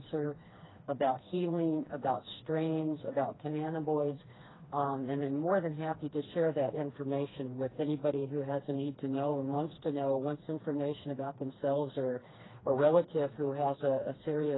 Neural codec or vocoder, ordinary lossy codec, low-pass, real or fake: codec, 16 kHz, 2 kbps, FreqCodec, smaller model; AAC, 16 kbps; 7.2 kHz; fake